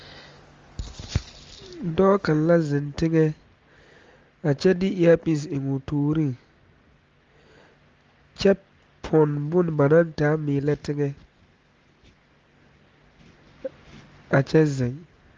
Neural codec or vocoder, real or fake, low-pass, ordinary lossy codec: none; real; 7.2 kHz; Opus, 32 kbps